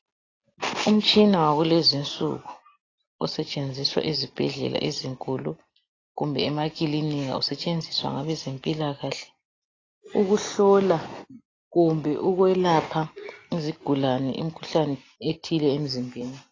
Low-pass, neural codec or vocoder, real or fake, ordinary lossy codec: 7.2 kHz; none; real; AAC, 32 kbps